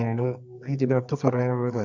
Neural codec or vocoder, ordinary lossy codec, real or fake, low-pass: codec, 24 kHz, 0.9 kbps, WavTokenizer, medium music audio release; none; fake; 7.2 kHz